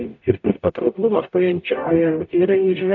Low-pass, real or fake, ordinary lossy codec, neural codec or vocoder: 7.2 kHz; fake; AAC, 48 kbps; codec, 44.1 kHz, 0.9 kbps, DAC